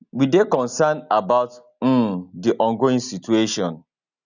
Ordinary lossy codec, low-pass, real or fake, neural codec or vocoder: none; 7.2 kHz; real; none